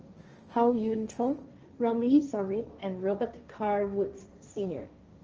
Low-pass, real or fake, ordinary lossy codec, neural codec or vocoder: 7.2 kHz; fake; Opus, 24 kbps; codec, 16 kHz, 1.1 kbps, Voila-Tokenizer